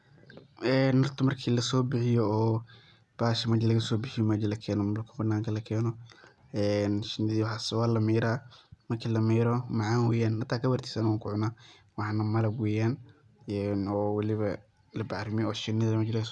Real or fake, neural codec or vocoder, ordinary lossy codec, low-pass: real; none; none; none